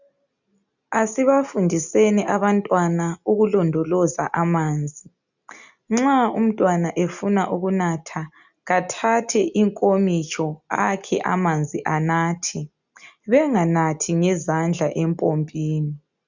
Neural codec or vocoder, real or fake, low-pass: none; real; 7.2 kHz